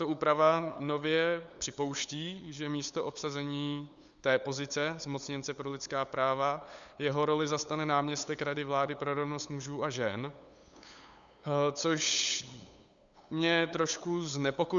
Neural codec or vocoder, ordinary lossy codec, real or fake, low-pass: codec, 16 kHz, 8 kbps, FunCodec, trained on LibriTTS, 25 frames a second; Opus, 64 kbps; fake; 7.2 kHz